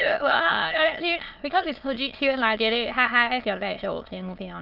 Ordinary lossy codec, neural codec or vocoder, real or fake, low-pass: Opus, 32 kbps; autoencoder, 22.05 kHz, a latent of 192 numbers a frame, VITS, trained on many speakers; fake; 5.4 kHz